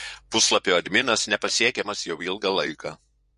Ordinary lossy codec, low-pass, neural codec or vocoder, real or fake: MP3, 48 kbps; 10.8 kHz; vocoder, 24 kHz, 100 mel bands, Vocos; fake